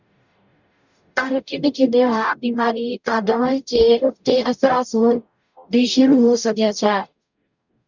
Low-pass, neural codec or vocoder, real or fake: 7.2 kHz; codec, 44.1 kHz, 0.9 kbps, DAC; fake